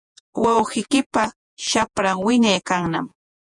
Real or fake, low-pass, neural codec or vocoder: fake; 10.8 kHz; vocoder, 48 kHz, 128 mel bands, Vocos